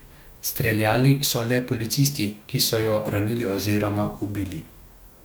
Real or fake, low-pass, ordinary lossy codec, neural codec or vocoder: fake; none; none; codec, 44.1 kHz, 2.6 kbps, DAC